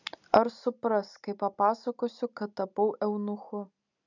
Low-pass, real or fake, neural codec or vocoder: 7.2 kHz; real; none